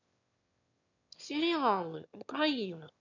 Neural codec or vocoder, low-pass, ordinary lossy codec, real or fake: autoencoder, 22.05 kHz, a latent of 192 numbers a frame, VITS, trained on one speaker; 7.2 kHz; none; fake